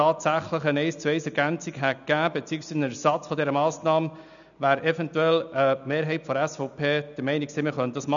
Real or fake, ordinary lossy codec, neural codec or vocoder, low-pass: real; none; none; 7.2 kHz